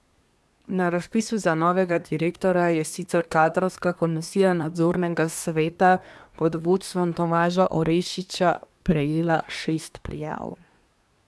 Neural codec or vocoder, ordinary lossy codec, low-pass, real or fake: codec, 24 kHz, 1 kbps, SNAC; none; none; fake